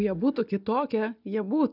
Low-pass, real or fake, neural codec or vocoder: 5.4 kHz; real; none